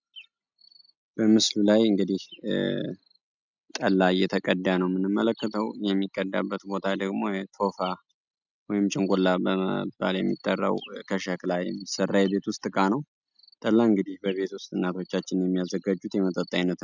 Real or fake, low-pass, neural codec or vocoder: real; 7.2 kHz; none